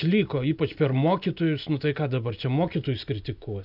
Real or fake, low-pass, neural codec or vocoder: real; 5.4 kHz; none